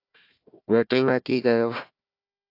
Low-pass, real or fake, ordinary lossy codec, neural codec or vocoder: 5.4 kHz; fake; AAC, 32 kbps; codec, 16 kHz, 1 kbps, FunCodec, trained on Chinese and English, 50 frames a second